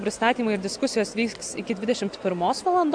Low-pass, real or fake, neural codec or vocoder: 9.9 kHz; real; none